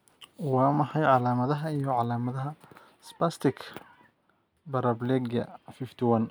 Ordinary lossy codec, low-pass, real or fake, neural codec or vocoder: none; none; real; none